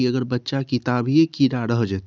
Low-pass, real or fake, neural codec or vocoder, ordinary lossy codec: none; real; none; none